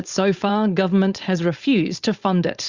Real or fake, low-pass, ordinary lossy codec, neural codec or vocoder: fake; 7.2 kHz; Opus, 64 kbps; codec, 16 kHz, 4.8 kbps, FACodec